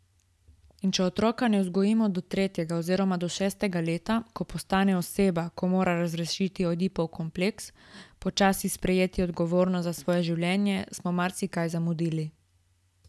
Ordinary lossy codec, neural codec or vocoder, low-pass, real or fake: none; none; none; real